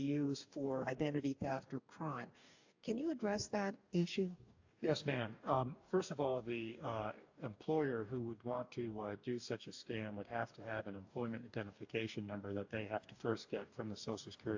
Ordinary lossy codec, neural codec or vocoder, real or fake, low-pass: AAC, 48 kbps; codec, 44.1 kHz, 2.6 kbps, DAC; fake; 7.2 kHz